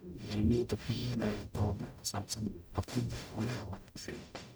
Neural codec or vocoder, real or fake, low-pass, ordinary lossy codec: codec, 44.1 kHz, 0.9 kbps, DAC; fake; none; none